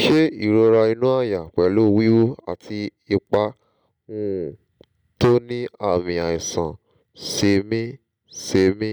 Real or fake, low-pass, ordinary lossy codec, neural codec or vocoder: real; 19.8 kHz; none; none